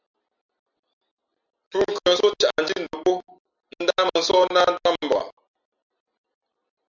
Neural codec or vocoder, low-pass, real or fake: none; 7.2 kHz; real